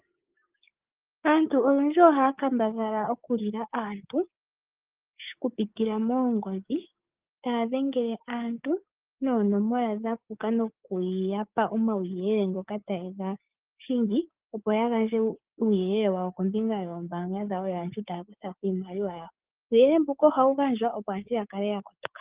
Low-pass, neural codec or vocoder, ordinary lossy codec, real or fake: 3.6 kHz; codec, 16 kHz, 6 kbps, DAC; Opus, 32 kbps; fake